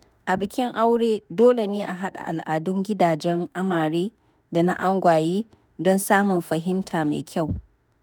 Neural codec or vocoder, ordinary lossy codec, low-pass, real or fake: autoencoder, 48 kHz, 32 numbers a frame, DAC-VAE, trained on Japanese speech; none; none; fake